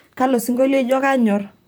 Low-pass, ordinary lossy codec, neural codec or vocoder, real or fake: none; none; vocoder, 44.1 kHz, 128 mel bands, Pupu-Vocoder; fake